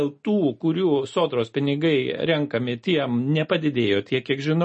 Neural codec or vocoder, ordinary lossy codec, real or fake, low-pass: none; MP3, 32 kbps; real; 9.9 kHz